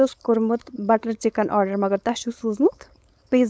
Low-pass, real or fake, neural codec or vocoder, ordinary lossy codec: none; fake; codec, 16 kHz, 4.8 kbps, FACodec; none